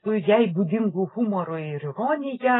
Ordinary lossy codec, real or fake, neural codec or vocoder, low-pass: AAC, 16 kbps; real; none; 7.2 kHz